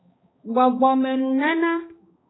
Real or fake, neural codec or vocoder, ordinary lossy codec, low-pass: fake; codec, 16 kHz, 2 kbps, X-Codec, HuBERT features, trained on balanced general audio; AAC, 16 kbps; 7.2 kHz